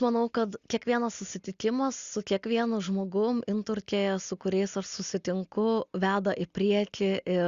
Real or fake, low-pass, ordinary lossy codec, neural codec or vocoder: real; 7.2 kHz; Opus, 64 kbps; none